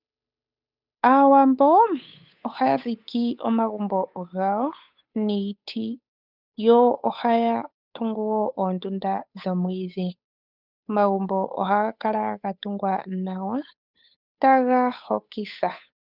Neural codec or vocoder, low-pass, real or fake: codec, 16 kHz, 8 kbps, FunCodec, trained on Chinese and English, 25 frames a second; 5.4 kHz; fake